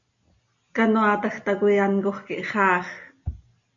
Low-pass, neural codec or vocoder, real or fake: 7.2 kHz; none; real